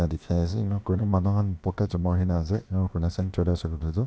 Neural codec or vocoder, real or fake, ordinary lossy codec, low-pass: codec, 16 kHz, about 1 kbps, DyCAST, with the encoder's durations; fake; none; none